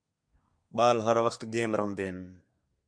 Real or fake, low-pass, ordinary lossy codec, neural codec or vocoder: fake; 9.9 kHz; MP3, 64 kbps; codec, 24 kHz, 1 kbps, SNAC